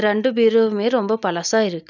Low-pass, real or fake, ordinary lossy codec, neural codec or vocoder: 7.2 kHz; real; none; none